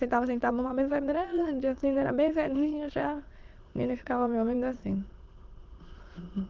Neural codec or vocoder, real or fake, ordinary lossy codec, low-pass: autoencoder, 22.05 kHz, a latent of 192 numbers a frame, VITS, trained on many speakers; fake; Opus, 16 kbps; 7.2 kHz